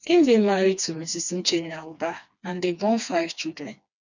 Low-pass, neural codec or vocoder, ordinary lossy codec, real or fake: 7.2 kHz; codec, 16 kHz, 2 kbps, FreqCodec, smaller model; none; fake